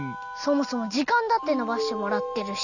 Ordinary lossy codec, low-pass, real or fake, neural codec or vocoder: none; 7.2 kHz; real; none